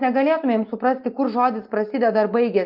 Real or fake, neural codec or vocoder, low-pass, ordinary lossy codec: real; none; 5.4 kHz; Opus, 24 kbps